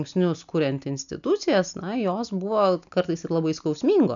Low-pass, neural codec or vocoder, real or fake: 7.2 kHz; none; real